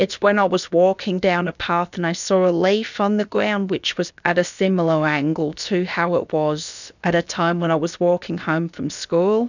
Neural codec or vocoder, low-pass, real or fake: codec, 16 kHz, about 1 kbps, DyCAST, with the encoder's durations; 7.2 kHz; fake